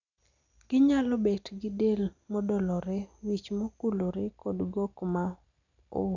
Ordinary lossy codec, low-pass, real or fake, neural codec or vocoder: none; 7.2 kHz; real; none